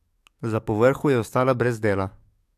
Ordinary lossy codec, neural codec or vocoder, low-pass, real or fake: AAC, 96 kbps; codec, 44.1 kHz, 7.8 kbps, DAC; 14.4 kHz; fake